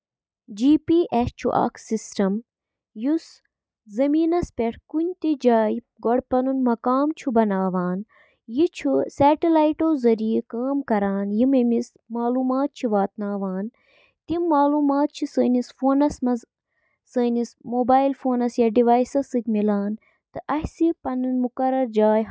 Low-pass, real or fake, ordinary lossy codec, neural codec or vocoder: none; real; none; none